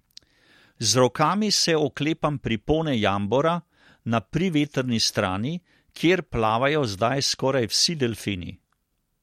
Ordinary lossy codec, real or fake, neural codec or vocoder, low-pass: MP3, 64 kbps; real; none; 19.8 kHz